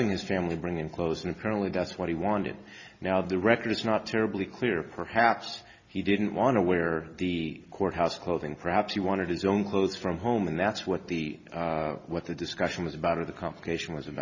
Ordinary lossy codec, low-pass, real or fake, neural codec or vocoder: Opus, 64 kbps; 7.2 kHz; real; none